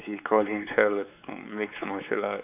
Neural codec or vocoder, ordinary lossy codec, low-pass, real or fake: codec, 16 kHz, 4 kbps, X-Codec, WavLM features, trained on Multilingual LibriSpeech; none; 3.6 kHz; fake